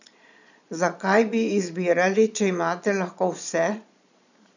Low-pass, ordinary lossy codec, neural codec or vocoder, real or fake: 7.2 kHz; none; vocoder, 22.05 kHz, 80 mel bands, Vocos; fake